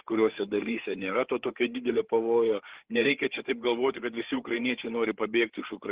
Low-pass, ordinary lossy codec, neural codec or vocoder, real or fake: 3.6 kHz; Opus, 16 kbps; codec, 16 kHz, 8 kbps, FreqCodec, larger model; fake